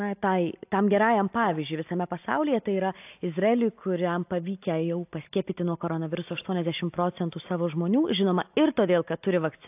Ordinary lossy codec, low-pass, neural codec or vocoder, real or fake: AAC, 32 kbps; 3.6 kHz; none; real